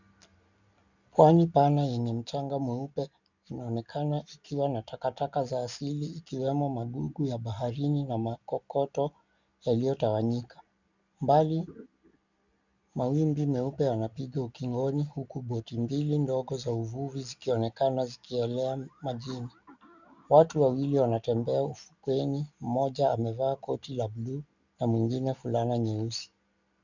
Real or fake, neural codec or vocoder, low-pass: real; none; 7.2 kHz